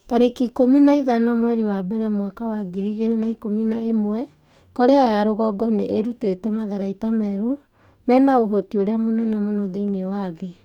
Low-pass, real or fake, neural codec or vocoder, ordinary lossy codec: 19.8 kHz; fake; codec, 44.1 kHz, 2.6 kbps, DAC; none